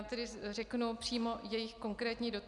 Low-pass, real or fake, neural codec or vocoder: 10.8 kHz; real; none